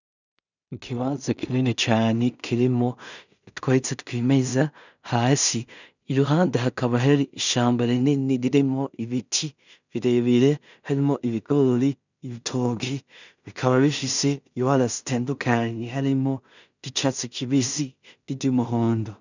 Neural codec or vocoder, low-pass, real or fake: codec, 16 kHz in and 24 kHz out, 0.4 kbps, LongCat-Audio-Codec, two codebook decoder; 7.2 kHz; fake